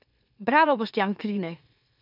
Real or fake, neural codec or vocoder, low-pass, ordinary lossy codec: fake; autoencoder, 44.1 kHz, a latent of 192 numbers a frame, MeloTTS; 5.4 kHz; none